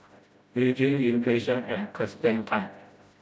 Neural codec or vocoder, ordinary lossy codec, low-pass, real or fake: codec, 16 kHz, 0.5 kbps, FreqCodec, smaller model; none; none; fake